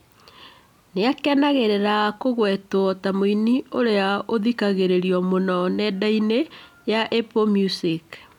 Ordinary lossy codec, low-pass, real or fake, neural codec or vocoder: none; 19.8 kHz; real; none